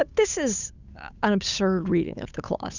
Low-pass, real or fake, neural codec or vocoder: 7.2 kHz; fake; codec, 16 kHz, 8 kbps, FunCodec, trained on Chinese and English, 25 frames a second